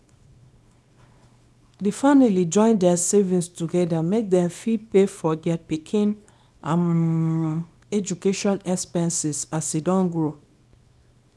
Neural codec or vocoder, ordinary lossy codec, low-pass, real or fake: codec, 24 kHz, 0.9 kbps, WavTokenizer, small release; none; none; fake